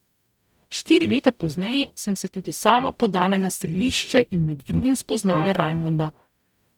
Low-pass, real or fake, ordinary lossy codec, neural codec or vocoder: 19.8 kHz; fake; none; codec, 44.1 kHz, 0.9 kbps, DAC